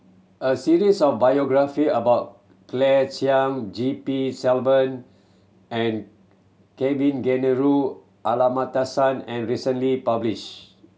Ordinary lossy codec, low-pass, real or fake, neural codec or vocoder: none; none; real; none